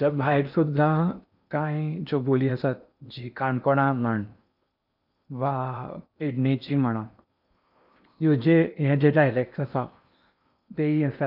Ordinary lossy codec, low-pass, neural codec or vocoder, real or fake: none; 5.4 kHz; codec, 16 kHz in and 24 kHz out, 0.8 kbps, FocalCodec, streaming, 65536 codes; fake